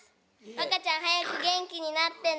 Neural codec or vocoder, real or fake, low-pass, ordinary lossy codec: none; real; none; none